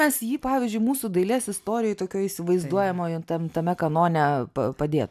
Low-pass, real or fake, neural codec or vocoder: 14.4 kHz; real; none